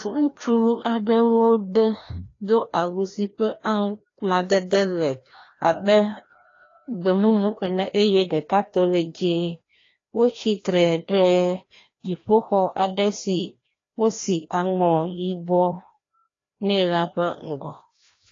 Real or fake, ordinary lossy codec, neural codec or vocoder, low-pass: fake; AAC, 32 kbps; codec, 16 kHz, 1 kbps, FreqCodec, larger model; 7.2 kHz